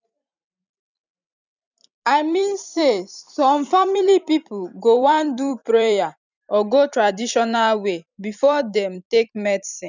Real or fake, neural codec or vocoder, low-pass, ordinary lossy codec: fake; vocoder, 44.1 kHz, 128 mel bands every 256 samples, BigVGAN v2; 7.2 kHz; none